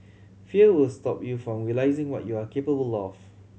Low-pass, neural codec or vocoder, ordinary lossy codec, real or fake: none; none; none; real